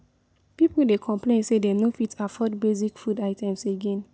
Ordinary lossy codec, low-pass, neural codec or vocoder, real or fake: none; none; none; real